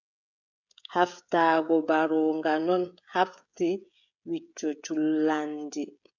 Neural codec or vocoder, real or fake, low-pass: codec, 16 kHz, 16 kbps, FreqCodec, smaller model; fake; 7.2 kHz